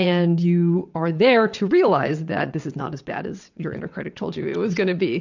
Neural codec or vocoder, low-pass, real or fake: vocoder, 22.05 kHz, 80 mel bands, WaveNeXt; 7.2 kHz; fake